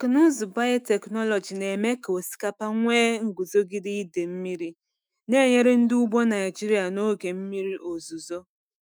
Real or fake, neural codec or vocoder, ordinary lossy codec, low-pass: fake; autoencoder, 48 kHz, 128 numbers a frame, DAC-VAE, trained on Japanese speech; none; none